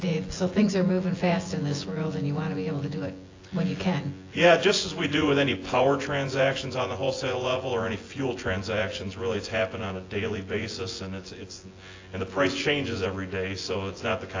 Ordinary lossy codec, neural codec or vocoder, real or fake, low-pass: AAC, 32 kbps; vocoder, 24 kHz, 100 mel bands, Vocos; fake; 7.2 kHz